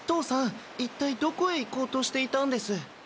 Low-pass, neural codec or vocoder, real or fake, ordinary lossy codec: none; none; real; none